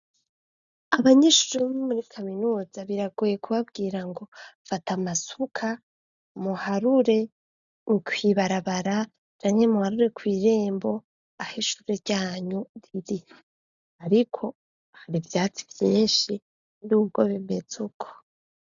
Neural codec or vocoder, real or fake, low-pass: none; real; 7.2 kHz